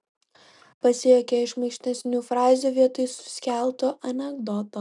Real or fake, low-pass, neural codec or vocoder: real; 10.8 kHz; none